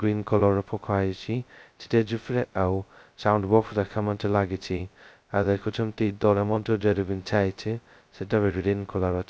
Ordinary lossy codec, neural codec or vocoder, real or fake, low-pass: none; codec, 16 kHz, 0.2 kbps, FocalCodec; fake; none